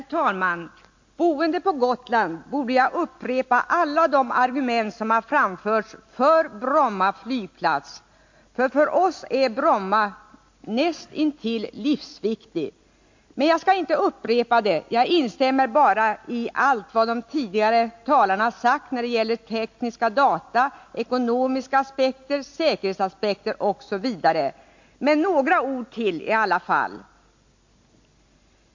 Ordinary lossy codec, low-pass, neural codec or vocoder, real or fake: MP3, 48 kbps; 7.2 kHz; none; real